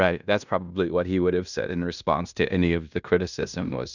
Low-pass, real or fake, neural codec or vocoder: 7.2 kHz; fake; codec, 16 kHz in and 24 kHz out, 0.9 kbps, LongCat-Audio-Codec, fine tuned four codebook decoder